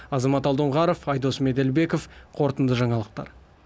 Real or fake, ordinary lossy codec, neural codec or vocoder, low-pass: real; none; none; none